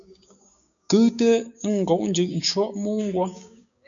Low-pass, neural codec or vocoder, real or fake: 7.2 kHz; codec, 16 kHz, 6 kbps, DAC; fake